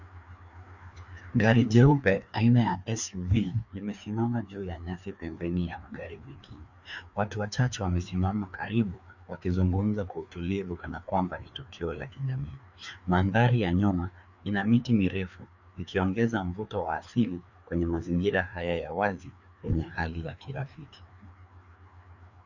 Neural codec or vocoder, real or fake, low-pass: codec, 16 kHz, 2 kbps, FreqCodec, larger model; fake; 7.2 kHz